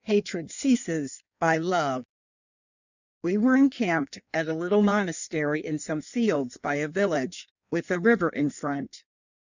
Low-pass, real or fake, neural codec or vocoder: 7.2 kHz; fake; codec, 16 kHz in and 24 kHz out, 1.1 kbps, FireRedTTS-2 codec